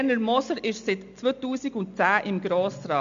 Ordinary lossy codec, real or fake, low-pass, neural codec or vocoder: MP3, 64 kbps; real; 7.2 kHz; none